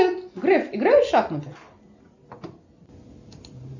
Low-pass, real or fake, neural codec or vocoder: 7.2 kHz; real; none